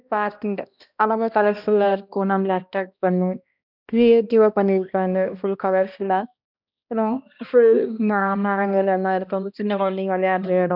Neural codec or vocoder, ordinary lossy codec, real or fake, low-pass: codec, 16 kHz, 1 kbps, X-Codec, HuBERT features, trained on balanced general audio; none; fake; 5.4 kHz